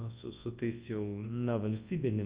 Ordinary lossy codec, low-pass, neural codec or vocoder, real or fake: Opus, 32 kbps; 3.6 kHz; codec, 24 kHz, 0.9 kbps, WavTokenizer, large speech release; fake